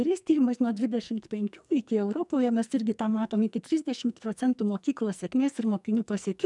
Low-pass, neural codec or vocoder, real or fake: 10.8 kHz; codec, 44.1 kHz, 2.6 kbps, SNAC; fake